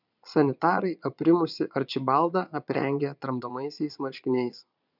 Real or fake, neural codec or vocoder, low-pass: fake; vocoder, 22.05 kHz, 80 mel bands, Vocos; 5.4 kHz